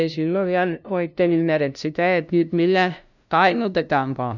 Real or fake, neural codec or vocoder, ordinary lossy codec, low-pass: fake; codec, 16 kHz, 0.5 kbps, FunCodec, trained on LibriTTS, 25 frames a second; none; 7.2 kHz